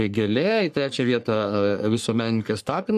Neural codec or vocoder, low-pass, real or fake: codec, 44.1 kHz, 3.4 kbps, Pupu-Codec; 14.4 kHz; fake